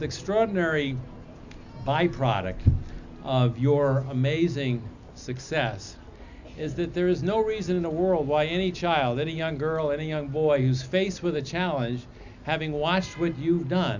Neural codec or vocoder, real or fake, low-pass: none; real; 7.2 kHz